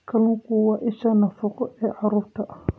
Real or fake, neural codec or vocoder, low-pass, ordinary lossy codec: real; none; none; none